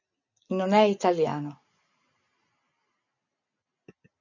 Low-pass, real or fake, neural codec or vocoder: 7.2 kHz; real; none